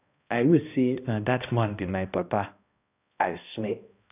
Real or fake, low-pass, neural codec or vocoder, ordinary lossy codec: fake; 3.6 kHz; codec, 16 kHz, 1 kbps, X-Codec, HuBERT features, trained on balanced general audio; none